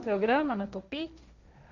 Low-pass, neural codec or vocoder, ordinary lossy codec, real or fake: 7.2 kHz; codec, 16 kHz, 1.1 kbps, Voila-Tokenizer; none; fake